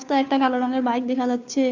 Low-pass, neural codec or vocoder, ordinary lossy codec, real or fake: 7.2 kHz; codec, 16 kHz, 2 kbps, FunCodec, trained on Chinese and English, 25 frames a second; none; fake